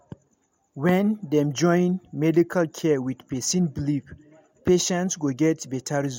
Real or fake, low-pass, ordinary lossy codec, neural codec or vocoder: real; 19.8 kHz; MP3, 64 kbps; none